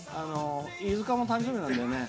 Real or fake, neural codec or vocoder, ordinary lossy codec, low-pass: real; none; none; none